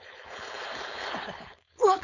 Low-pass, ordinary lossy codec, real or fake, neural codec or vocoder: 7.2 kHz; none; fake; codec, 16 kHz, 4.8 kbps, FACodec